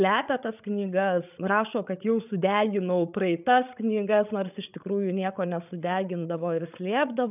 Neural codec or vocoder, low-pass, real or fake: codec, 16 kHz, 8 kbps, FreqCodec, larger model; 3.6 kHz; fake